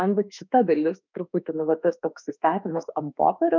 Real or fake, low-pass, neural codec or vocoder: fake; 7.2 kHz; autoencoder, 48 kHz, 32 numbers a frame, DAC-VAE, trained on Japanese speech